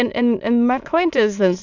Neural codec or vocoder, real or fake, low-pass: autoencoder, 22.05 kHz, a latent of 192 numbers a frame, VITS, trained on many speakers; fake; 7.2 kHz